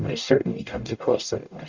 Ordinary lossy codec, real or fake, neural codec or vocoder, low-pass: Opus, 64 kbps; fake; codec, 44.1 kHz, 0.9 kbps, DAC; 7.2 kHz